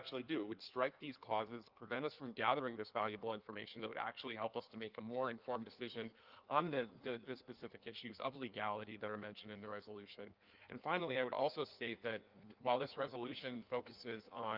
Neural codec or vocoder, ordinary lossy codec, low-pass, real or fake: codec, 16 kHz in and 24 kHz out, 1.1 kbps, FireRedTTS-2 codec; Opus, 24 kbps; 5.4 kHz; fake